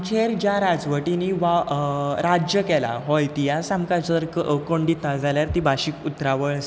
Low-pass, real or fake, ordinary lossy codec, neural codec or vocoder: none; real; none; none